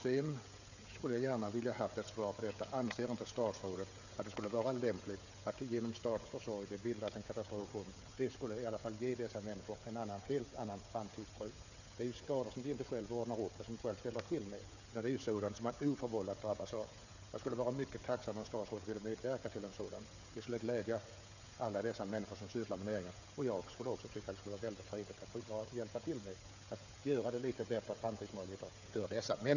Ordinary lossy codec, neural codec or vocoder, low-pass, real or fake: none; codec, 16 kHz, 16 kbps, FreqCodec, smaller model; 7.2 kHz; fake